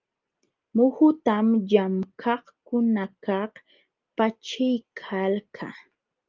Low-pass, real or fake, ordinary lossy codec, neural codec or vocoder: 7.2 kHz; real; Opus, 24 kbps; none